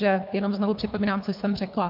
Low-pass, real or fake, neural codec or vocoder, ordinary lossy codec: 5.4 kHz; fake; codec, 24 kHz, 3 kbps, HILCodec; MP3, 48 kbps